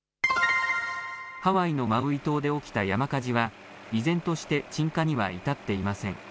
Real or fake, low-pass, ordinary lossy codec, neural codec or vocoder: real; none; none; none